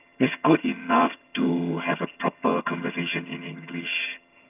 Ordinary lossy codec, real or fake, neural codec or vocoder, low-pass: none; fake; vocoder, 22.05 kHz, 80 mel bands, HiFi-GAN; 3.6 kHz